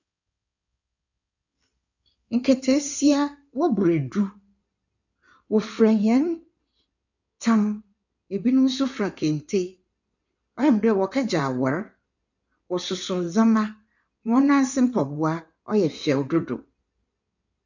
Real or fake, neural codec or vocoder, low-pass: fake; codec, 16 kHz in and 24 kHz out, 2.2 kbps, FireRedTTS-2 codec; 7.2 kHz